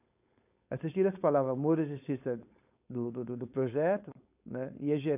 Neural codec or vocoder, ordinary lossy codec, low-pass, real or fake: codec, 16 kHz, 4.8 kbps, FACodec; AAC, 32 kbps; 3.6 kHz; fake